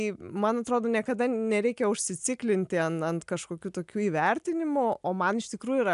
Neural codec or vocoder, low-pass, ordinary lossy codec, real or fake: none; 10.8 kHz; AAC, 96 kbps; real